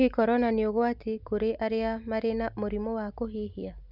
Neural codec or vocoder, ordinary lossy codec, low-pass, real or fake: none; none; 5.4 kHz; real